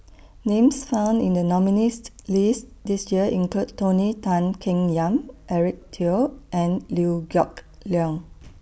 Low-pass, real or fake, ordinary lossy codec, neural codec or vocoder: none; real; none; none